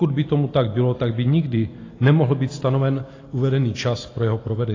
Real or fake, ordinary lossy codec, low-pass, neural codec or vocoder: real; AAC, 32 kbps; 7.2 kHz; none